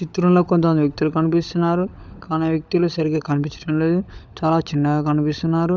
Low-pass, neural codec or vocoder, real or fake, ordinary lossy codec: none; codec, 16 kHz, 16 kbps, FunCodec, trained on LibriTTS, 50 frames a second; fake; none